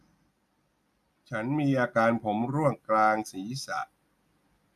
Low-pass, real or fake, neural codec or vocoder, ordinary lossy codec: 14.4 kHz; real; none; none